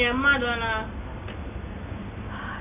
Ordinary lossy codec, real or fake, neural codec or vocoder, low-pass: AAC, 24 kbps; real; none; 3.6 kHz